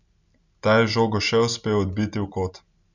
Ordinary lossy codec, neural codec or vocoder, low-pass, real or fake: none; none; 7.2 kHz; real